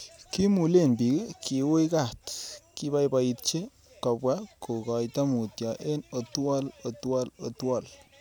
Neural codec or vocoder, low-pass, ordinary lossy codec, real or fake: none; none; none; real